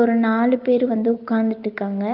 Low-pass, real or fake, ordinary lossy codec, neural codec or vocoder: 5.4 kHz; real; Opus, 24 kbps; none